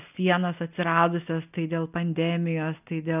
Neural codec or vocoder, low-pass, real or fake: none; 3.6 kHz; real